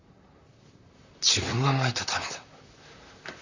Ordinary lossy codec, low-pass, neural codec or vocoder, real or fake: Opus, 64 kbps; 7.2 kHz; vocoder, 44.1 kHz, 128 mel bands, Pupu-Vocoder; fake